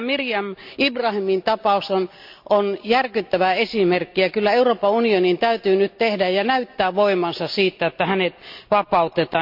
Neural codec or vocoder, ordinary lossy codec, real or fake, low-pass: none; MP3, 48 kbps; real; 5.4 kHz